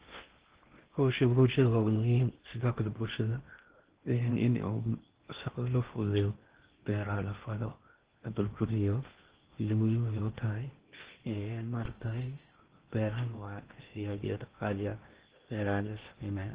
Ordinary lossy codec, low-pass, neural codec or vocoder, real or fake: Opus, 16 kbps; 3.6 kHz; codec, 16 kHz in and 24 kHz out, 0.6 kbps, FocalCodec, streaming, 2048 codes; fake